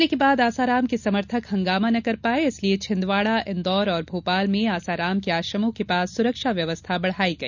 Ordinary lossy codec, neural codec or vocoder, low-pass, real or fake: none; none; 7.2 kHz; real